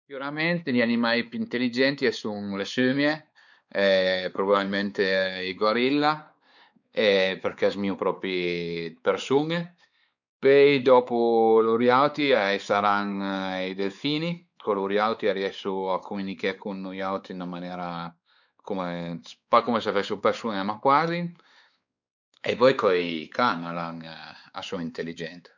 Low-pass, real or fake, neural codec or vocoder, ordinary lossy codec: 7.2 kHz; fake; codec, 16 kHz, 4 kbps, X-Codec, WavLM features, trained on Multilingual LibriSpeech; none